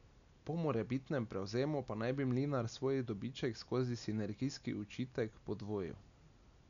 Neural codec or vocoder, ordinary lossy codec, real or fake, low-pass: none; none; real; 7.2 kHz